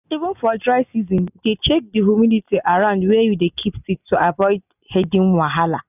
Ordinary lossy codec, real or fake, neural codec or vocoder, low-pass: none; real; none; 3.6 kHz